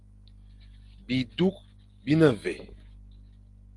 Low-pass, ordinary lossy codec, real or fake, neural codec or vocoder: 10.8 kHz; Opus, 24 kbps; real; none